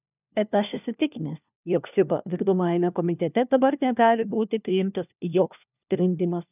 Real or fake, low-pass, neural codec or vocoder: fake; 3.6 kHz; codec, 16 kHz, 1 kbps, FunCodec, trained on LibriTTS, 50 frames a second